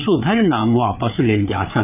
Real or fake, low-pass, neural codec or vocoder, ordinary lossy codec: fake; 3.6 kHz; codec, 16 kHz, 4 kbps, FreqCodec, larger model; none